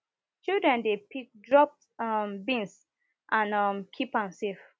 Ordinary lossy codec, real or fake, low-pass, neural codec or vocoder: none; real; none; none